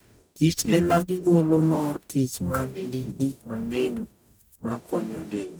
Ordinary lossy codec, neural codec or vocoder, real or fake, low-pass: none; codec, 44.1 kHz, 0.9 kbps, DAC; fake; none